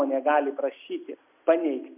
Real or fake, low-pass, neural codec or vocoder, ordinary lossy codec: real; 3.6 kHz; none; MP3, 32 kbps